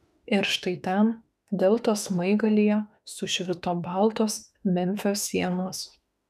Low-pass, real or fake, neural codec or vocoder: 14.4 kHz; fake; autoencoder, 48 kHz, 32 numbers a frame, DAC-VAE, trained on Japanese speech